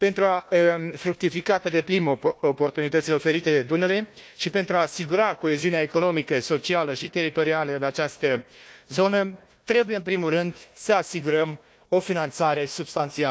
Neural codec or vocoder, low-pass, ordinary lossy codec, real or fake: codec, 16 kHz, 1 kbps, FunCodec, trained on Chinese and English, 50 frames a second; none; none; fake